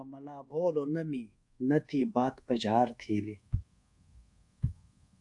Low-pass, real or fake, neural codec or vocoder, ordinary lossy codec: 10.8 kHz; fake; codec, 24 kHz, 1.2 kbps, DualCodec; AAC, 64 kbps